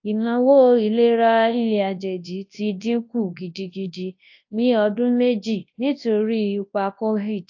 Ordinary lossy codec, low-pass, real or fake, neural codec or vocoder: AAC, 48 kbps; 7.2 kHz; fake; codec, 24 kHz, 0.9 kbps, WavTokenizer, large speech release